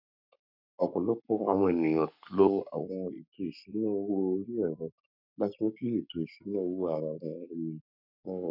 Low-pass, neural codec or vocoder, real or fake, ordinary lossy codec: 5.4 kHz; codec, 44.1 kHz, 7.8 kbps, Pupu-Codec; fake; none